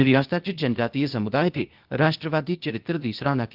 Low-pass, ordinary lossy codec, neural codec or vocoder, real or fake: 5.4 kHz; Opus, 32 kbps; codec, 16 kHz, 0.8 kbps, ZipCodec; fake